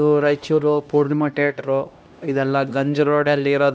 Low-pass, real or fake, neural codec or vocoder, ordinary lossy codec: none; fake; codec, 16 kHz, 1 kbps, X-Codec, HuBERT features, trained on LibriSpeech; none